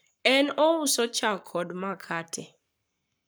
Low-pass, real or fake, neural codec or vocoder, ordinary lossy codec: none; fake; codec, 44.1 kHz, 7.8 kbps, Pupu-Codec; none